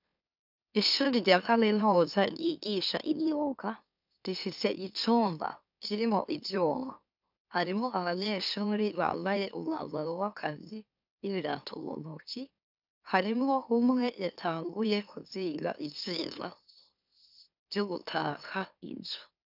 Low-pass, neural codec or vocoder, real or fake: 5.4 kHz; autoencoder, 44.1 kHz, a latent of 192 numbers a frame, MeloTTS; fake